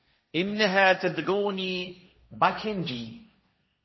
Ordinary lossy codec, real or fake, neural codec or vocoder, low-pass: MP3, 24 kbps; fake; codec, 16 kHz, 1.1 kbps, Voila-Tokenizer; 7.2 kHz